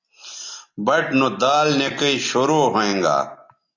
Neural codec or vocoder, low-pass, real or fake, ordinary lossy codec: none; 7.2 kHz; real; AAC, 48 kbps